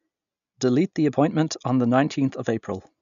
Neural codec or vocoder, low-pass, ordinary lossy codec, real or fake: none; 7.2 kHz; none; real